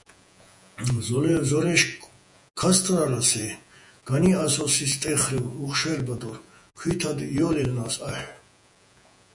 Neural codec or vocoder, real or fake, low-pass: vocoder, 48 kHz, 128 mel bands, Vocos; fake; 10.8 kHz